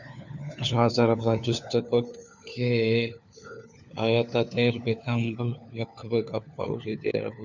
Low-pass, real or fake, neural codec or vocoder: 7.2 kHz; fake; codec, 16 kHz, 4 kbps, FunCodec, trained on LibriTTS, 50 frames a second